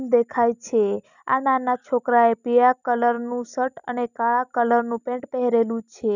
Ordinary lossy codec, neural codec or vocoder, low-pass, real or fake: none; none; 7.2 kHz; real